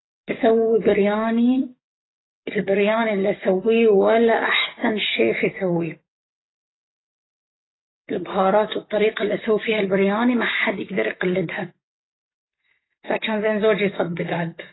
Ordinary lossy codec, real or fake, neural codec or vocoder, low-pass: AAC, 16 kbps; fake; codec, 44.1 kHz, 7.8 kbps, DAC; 7.2 kHz